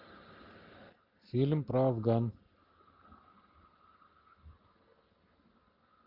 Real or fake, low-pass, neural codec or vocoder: real; 5.4 kHz; none